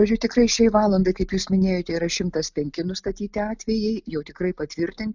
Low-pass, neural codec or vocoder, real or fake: 7.2 kHz; none; real